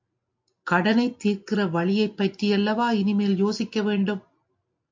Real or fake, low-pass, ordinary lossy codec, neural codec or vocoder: real; 7.2 kHz; MP3, 48 kbps; none